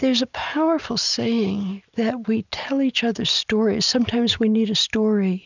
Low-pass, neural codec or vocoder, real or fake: 7.2 kHz; none; real